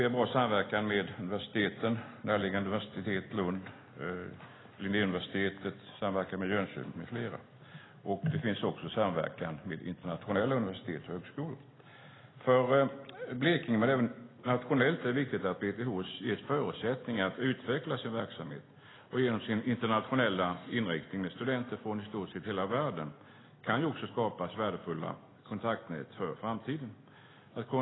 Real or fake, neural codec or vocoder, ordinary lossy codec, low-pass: real; none; AAC, 16 kbps; 7.2 kHz